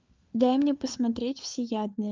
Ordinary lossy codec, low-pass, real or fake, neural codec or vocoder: Opus, 24 kbps; 7.2 kHz; fake; codec, 16 kHz, 4 kbps, FunCodec, trained on LibriTTS, 50 frames a second